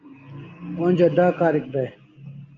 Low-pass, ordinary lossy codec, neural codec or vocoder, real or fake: 7.2 kHz; Opus, 24 kbps; none; real